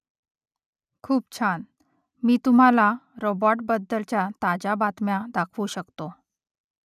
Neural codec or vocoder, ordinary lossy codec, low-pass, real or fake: none; none; 14.4 kHz; real